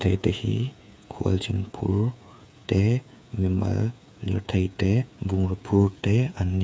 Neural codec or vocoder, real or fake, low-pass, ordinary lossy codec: codec, 16 kHz, 8 kbps, FreqCodec, smaller model; fake; none; none